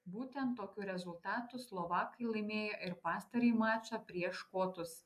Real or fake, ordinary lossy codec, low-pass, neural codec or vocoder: real; AAC, 96 kbps; 14.4 kHz; none